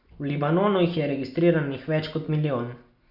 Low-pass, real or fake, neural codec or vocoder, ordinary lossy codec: 5.4 kHz; real; none; Opus, 64 kbps